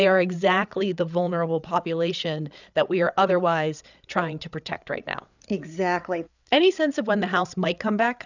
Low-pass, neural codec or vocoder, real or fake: 7.2 kHz; codec, 16 kHz, 8 kbps, FreqCodec, larger model; fake